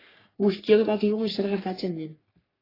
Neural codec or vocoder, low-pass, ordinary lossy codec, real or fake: codec, 44.1 kHz, 3.4 kbps, Pupu-Codec; 5.4 kHz; AAC, 24 kbps; fake